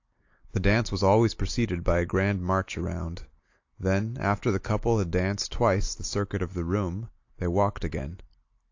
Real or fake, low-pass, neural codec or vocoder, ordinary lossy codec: real; 7.2 kHz; none; AAC, 48 kbps